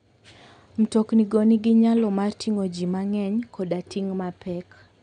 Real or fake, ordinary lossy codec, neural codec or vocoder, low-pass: real; none; none; 9.9 kHz